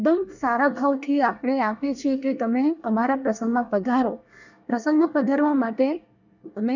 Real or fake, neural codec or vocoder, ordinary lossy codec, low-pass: fake; codec, 24 kHz, 1 kbps, SNAC; none; 7.2 kHz